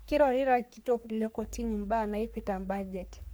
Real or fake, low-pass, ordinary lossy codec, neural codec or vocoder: fake; none; none; codec, 44.1 kHz, 3.4 kbps, Pupu-Codec